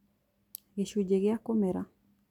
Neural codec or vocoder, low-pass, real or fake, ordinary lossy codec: none; 19.8 kHz; real; none